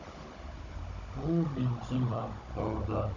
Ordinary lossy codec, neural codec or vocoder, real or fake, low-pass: none; codec, 16 kHz, 16 kbps, FunCodec, trained on Chinese and English, 50 frames a second; fake; 7.2 kHz